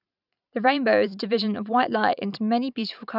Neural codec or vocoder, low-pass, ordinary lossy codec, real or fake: vocoder, 44.1 kHz, 128 mel bands every 512 samples, BigVGAN v2; 5.4 kHz; none; fake